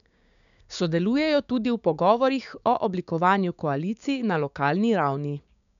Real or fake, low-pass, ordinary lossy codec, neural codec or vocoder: fake; 7.2 kHz; none; codec, 16 kHz, 6 kbps, DAC